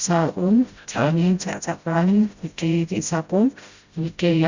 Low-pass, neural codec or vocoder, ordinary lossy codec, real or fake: 7.2 kHz; codec, 16 kHz, 0.5 kbps, FreqCodec, smaller model; Opus, 64 kbps; fake